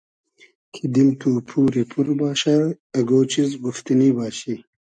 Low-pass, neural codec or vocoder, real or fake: 9.9 kHz; none; real